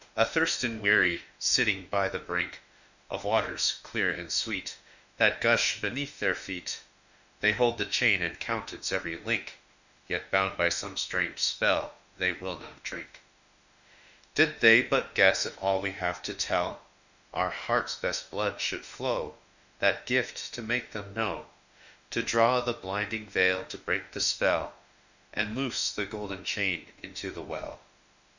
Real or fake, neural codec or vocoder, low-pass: fake; autoencoder, 48 kHz, 32 numbers a frame, DAC-VAE, trained on Japanese speech; 7.2 kHz